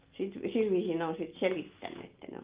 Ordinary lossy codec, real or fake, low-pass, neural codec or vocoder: Opus, 32 kbps; real; 3.6 kHz; none